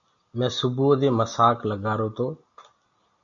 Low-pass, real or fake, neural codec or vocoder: 7.2 kHz; real; none